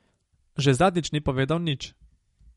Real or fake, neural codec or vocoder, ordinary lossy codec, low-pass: real; none; MP3, 48 kbps; 19.8 kHz